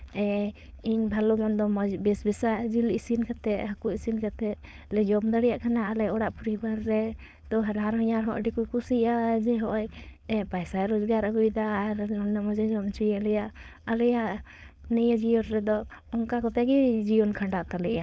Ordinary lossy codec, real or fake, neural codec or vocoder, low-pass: none; fake; codec, 16 kHz, 4.8 kbps, FACodec; none